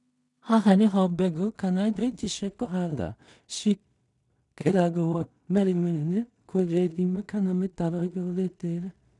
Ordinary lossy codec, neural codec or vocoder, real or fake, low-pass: none; codec, 16 kHz in and 24 kHz out, 0.4 kbps, LongCat-Audio-Codec, two codebook decoder; fake; 10.8 kHz